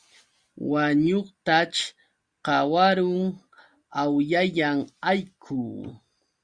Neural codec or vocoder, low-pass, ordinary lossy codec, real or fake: none; 9.9 kHz; Opus, 64 kbps; real